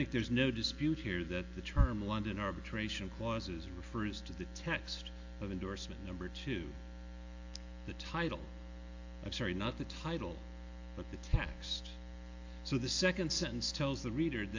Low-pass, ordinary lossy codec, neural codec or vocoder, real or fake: 7.2 kHz; AAC, 48 kbps; none; real